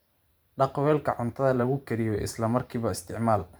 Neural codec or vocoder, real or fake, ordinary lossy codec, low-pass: vocoder, 44.1 kHz, 128 mel bands every 512 samples, BigVGAN v2; fake; none; none